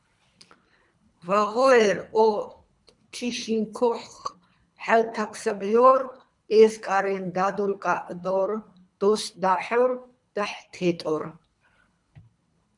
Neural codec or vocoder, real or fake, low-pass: codec, 24 kHz, 3 kbps, HILCodec; fake; 10.8 kHz